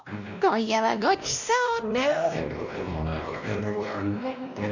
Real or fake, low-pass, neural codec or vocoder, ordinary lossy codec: fake; 7.2 kHz; codec, 16 kHz, 1 kbps, X-Codec, WavLM features, trained on Multilingual LibriSpeech; none